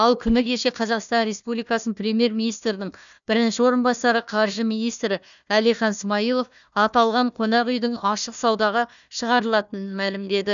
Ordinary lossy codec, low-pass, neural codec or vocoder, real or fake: none; 7.2 kHz; codec, 16 kHz, 1 kbps, FunCodec, trained on Chinese and English, 50 frames a second; fake